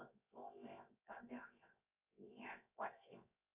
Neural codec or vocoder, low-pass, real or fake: codec, 16 kHz, 0.7 kbps, FocalCodec; 3.6 kHz; fake